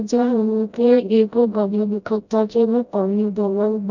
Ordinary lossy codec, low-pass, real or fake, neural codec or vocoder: none; 7.2 kHz; fake; codec, 16 kHz, 0.5 kbps, FreqCodec, smaller model